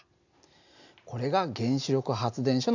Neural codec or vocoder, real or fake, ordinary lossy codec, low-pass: none; real; none; 7.2 kHz